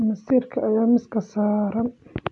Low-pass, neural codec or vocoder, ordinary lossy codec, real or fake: 10.8 kHz; none; none; real